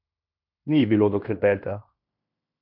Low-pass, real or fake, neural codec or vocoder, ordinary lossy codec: 5.4 kHz; fake; codec, 16 kHz in and 24 kHz out, 0.9 kbps, LongCat-Audio-Codec, fine tuned four codebook decoder; AAC, 48 kbps